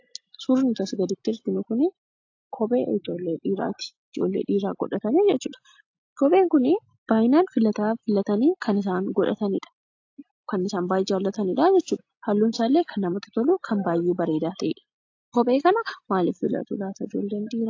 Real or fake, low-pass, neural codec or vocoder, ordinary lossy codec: real; 7.2 kHz; none; AAC, 48 kbps